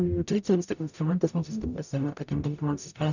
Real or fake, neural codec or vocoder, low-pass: fake; codec, 44.1 kHz, 0.9 kbps, DAC; 7.2 kHz